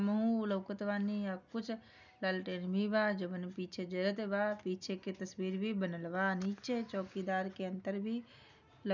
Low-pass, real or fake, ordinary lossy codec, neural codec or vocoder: 7.2 kHz; real; none; none